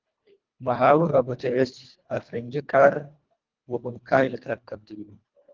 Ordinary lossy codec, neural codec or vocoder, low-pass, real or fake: Opus, 32 kbps; codec, 24 kHz, 1.5 kbps, HILCodec; 7.2 kHz; fake